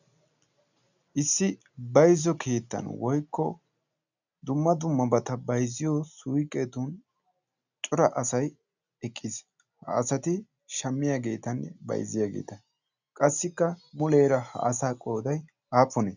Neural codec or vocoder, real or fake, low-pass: none; real; 7.2 kHz